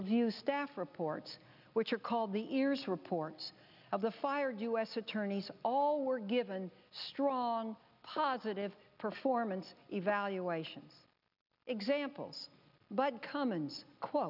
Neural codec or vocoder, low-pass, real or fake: none; 5.4 kHz; real